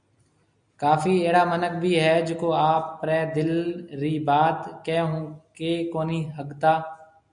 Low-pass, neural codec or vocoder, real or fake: 9.9 kHz; none; real